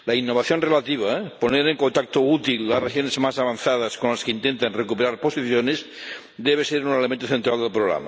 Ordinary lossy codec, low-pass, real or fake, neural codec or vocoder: none; none; real; none